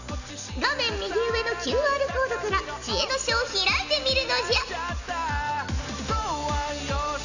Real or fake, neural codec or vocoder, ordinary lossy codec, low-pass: real; none; none; 7.2 kHz